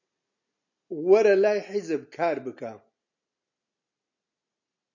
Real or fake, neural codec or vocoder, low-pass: real; none; 7.2 kHz